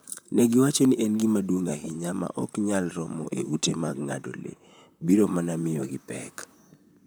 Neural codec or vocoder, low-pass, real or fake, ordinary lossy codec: vocoder, 44.1 kHz, 128 mel bands, Pupu-Vocoder; none; fake; none